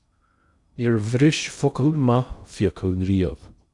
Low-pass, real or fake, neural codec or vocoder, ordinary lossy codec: 10.8 kHz; fake; codec, 16 kHz in and 24 kHz out, 0.6 kbps, FocalCodec, streaming, 2048 codes; Opus, 64 kbps